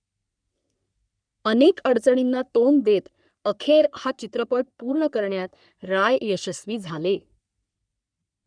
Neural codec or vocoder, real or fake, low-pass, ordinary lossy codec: codec, 44.1 kHz, 3.4 kbps, Pupu-Codec; fake; 9.9 kHz; none